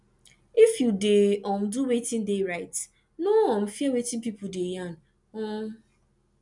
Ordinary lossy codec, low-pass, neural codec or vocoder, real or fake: none; 10.8 kHz; none; real